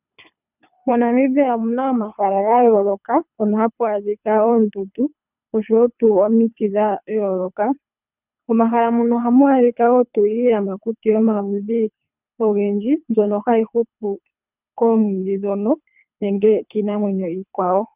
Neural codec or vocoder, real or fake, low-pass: codec, 24 kHz, 3 kbps, HILCodec; fake; 3.6 kHz